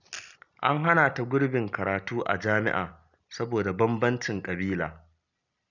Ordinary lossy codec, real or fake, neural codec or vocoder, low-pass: none; real; none; 7.2 kHz